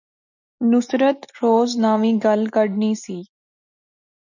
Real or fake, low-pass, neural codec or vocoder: real; 7.2 kHz; none